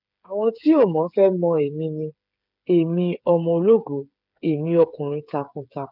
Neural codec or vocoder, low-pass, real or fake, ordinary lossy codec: codec, 16 kHz, 8 kbps, FreqCodec, smaller model; 5.4 kHz; fake; none